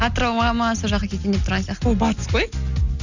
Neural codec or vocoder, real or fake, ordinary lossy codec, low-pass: none; real; none; 7.2 kHz